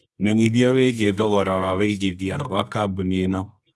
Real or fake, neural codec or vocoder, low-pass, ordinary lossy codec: fake; codec, 24 kHz, 0.9 kbps, WavTokenizer, medium music audio release; none; none